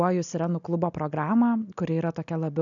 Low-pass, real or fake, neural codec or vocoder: 7.2 kHz; real; none